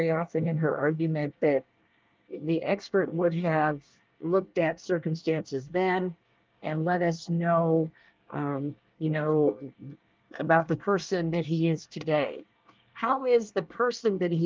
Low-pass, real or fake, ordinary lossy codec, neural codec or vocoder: 7.2 kHz; fake; Opus, 24 kbps; codec, 24 kHz, 1 kbps, SNAC